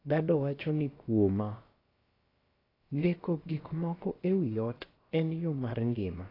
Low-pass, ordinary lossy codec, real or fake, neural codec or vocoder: 5.4 kHz; AAC, 24 kbps; fake; codec, 16 kHz, about 1 kbps, DyCAST, with the encoder's durations